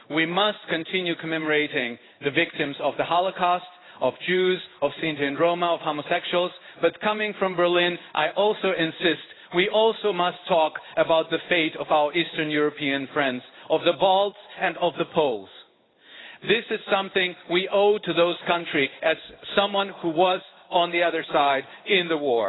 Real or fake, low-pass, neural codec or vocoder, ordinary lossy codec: fake; 7.2 kHz; codec, 16 kHz in and 24 kHz out, 1 kbps, XY-Tokenizer; AAC, 16 kbps